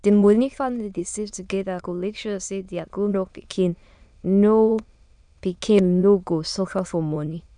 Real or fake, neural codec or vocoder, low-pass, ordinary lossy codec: fake; autoencoder, 22.05 kHz, a latent of 192 numbers a frame, VITS, trained on many speakers; 9.9 kHz; none